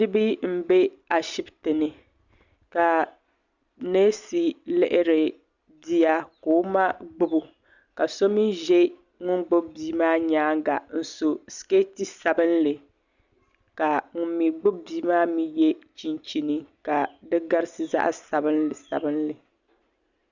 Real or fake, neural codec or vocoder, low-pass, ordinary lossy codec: real; none; 7.2 kHz; Opus, 64 kbps